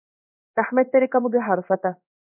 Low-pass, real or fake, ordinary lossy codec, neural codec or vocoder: 3.6 kHz; fake; MP3, 32 kbps; codec, 16 kHz in and 24 kHz out, 1 kbps, XY-Tokenizer